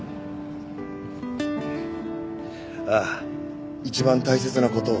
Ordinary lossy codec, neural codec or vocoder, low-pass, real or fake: none; none; none; real